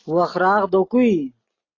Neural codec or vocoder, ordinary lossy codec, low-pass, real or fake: none; AAC, 32 kbps; 7.2 kHz; real